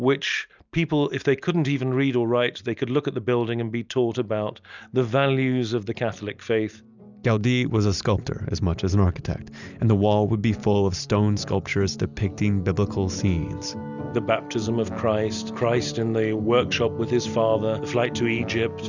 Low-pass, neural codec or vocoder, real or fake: 7.2 kHz; none; real